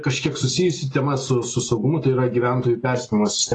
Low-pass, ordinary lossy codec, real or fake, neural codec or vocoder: 10.8 kHz; AAC, 32 kbps; real; none